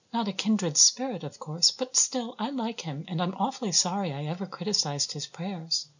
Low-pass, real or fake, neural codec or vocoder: 7.2 kHz; real; none